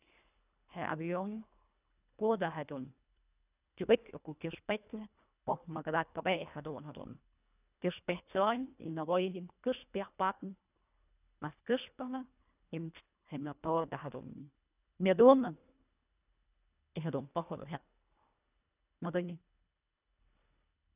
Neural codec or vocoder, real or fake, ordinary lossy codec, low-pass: codec, 24 kHz, 1.5 kbps, HILCodec; fake; none; 3.6 kHz